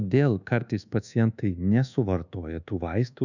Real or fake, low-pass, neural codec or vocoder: fake; 7.2 kHz; codec, 24 kHz, 1.2 kbps, DualCodec